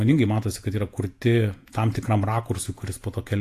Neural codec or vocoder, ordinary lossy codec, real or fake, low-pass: vocoder, 48 kHz, 128 mel bands, Vocos; AAC, 64 kbps; fake; 14.4 kHz